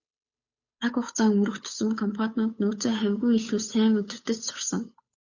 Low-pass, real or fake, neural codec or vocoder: 7.2 kHz; fake; codec, 16 kHz, 8 kbps, FunCodec, trained on Chinese and English, 25 frames a second